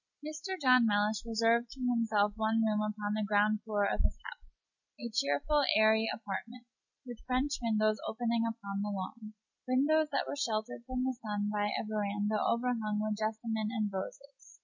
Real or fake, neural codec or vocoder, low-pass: real; none; 7.2 kHz